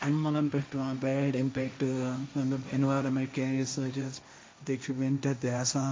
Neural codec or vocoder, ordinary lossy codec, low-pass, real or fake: codec, 16 kHz, 1.1 kbps, Voila-Tokenizer; none; none; fake